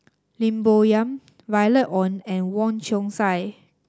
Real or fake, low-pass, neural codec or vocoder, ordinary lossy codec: real; none; none; none